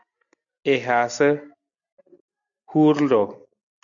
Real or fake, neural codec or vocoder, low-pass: real; none; 7.2 kHz